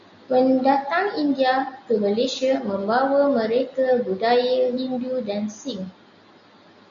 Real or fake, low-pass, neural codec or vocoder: real; 7.2 kHz; none